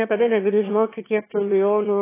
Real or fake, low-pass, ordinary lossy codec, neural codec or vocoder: fake; 3.6 kHz; AAC, 16 kbps; autoencoder, 22.05 kHz, a latent of 192 numbers a frame, VITS, trained on one speaker